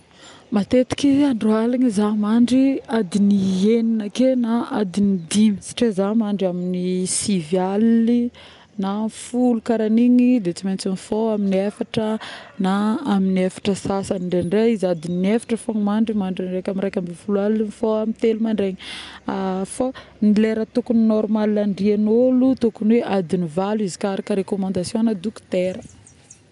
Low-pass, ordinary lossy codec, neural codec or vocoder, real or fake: 10.8 kHz; none; none; real